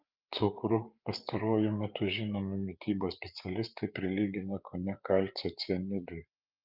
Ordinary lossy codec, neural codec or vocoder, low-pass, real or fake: Opus, 24 kbps; codec, 16 kHz, 8 kbps, FreqCodec, larger model; 5.4 kHz; fake